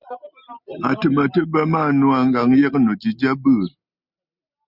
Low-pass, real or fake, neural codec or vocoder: 5.4 kHz; real; none